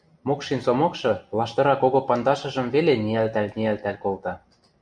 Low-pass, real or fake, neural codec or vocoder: 9.9 kHz; real; none